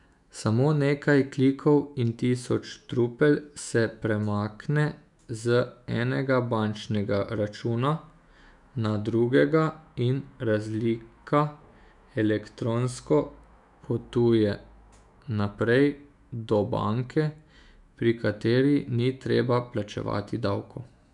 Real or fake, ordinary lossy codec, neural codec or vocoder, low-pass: fake; none; autoencoder, 48 kHz, 128 numbers a frame, DAC-VAE, trained on Japanese speech; 10.8 kHz